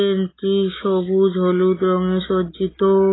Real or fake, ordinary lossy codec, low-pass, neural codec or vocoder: real; AAC, 16 kbps; 7.2 kHz; none